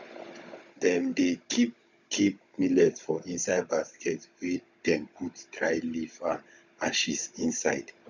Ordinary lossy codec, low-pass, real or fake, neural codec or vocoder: none; 7.2 kHz; fake; codec, 16 kHz, 16 kbps, FunCodec, trained on Chinese and English, 50 frames a second